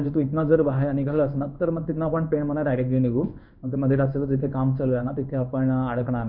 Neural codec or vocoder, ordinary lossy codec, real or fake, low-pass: codec, 16 kHz in and 24 kHz out, 1 kbps, XY-Tokenizer; none; fake; 5.4 kHz